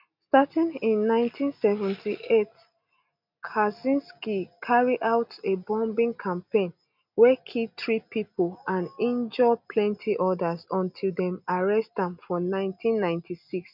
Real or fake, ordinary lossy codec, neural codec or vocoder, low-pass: real; none; none; 5.4 kHz